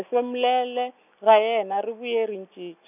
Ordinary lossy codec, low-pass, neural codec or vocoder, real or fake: none; 3.6 kHz; none; real